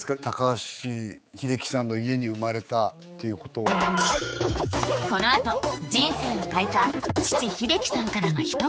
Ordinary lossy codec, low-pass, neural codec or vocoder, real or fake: none; none; codec, 16 kHz, 4 kbps, X-Codec, HuBERT features, trained on general audio; fake